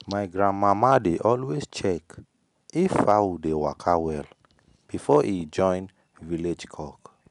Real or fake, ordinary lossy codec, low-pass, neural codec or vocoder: real; none; 10.8 kHz; none